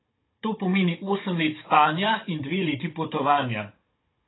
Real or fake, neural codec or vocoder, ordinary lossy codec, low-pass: fake; codec, 16 kHz, 16 kbps, FunCodec, trained on Chinese and English, 50 frames a second; AAC, 16 kbps; 7.2 kHz